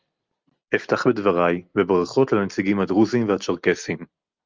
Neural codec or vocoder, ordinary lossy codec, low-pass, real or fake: none; Opus, 24 kbps; 7.2 kHz; real